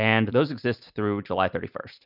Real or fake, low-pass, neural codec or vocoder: real; 5.4 kHz; none